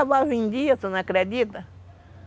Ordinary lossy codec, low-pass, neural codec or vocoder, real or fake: none; none; none; real